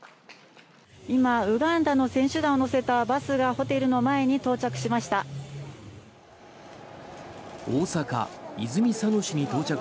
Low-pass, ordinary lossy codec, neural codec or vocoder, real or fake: none; none; none; real